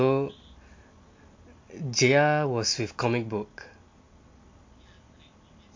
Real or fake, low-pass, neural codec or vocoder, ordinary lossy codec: real; 7.2 kHz; none; MP3, 48 kbps